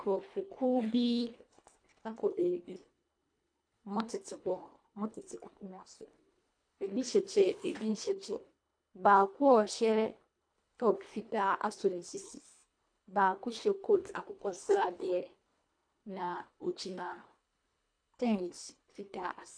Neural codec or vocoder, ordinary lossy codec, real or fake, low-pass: codec, 24 kHz, 1.5 kbps, HILCodec; AAC, 64 kbps; fake; 9.9 kHz